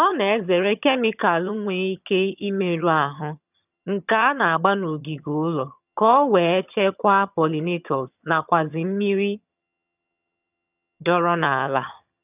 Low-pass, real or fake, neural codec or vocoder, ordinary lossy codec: 3.6 kHz; fake; vocoder, 22.05 kHz, 80 mel bands, HiFi-GAN; none